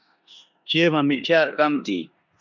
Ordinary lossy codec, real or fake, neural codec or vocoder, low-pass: MP3, 64 kbps; fake; codec, 16 kHz in and 24 kHz out, 0.9 kbps, LongCat-Audio-Codec, four codebook decoder; 7.2 kHz